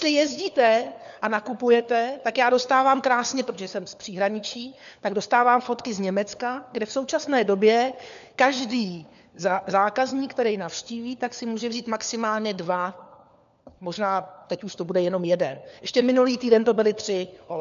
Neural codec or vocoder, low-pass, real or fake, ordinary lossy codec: codec, 16 kHz, 4 kbps, FunCodec, trained on LibriTTS, 50 frames a second; 7.2 kHz; fake; AAC, 96 kbps